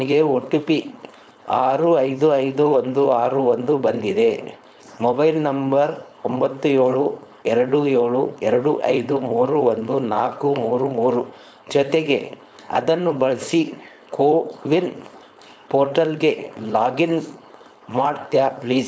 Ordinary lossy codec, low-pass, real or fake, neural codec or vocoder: none; none; fake; codec, 16 kHz, 4.8 kbps, FACodec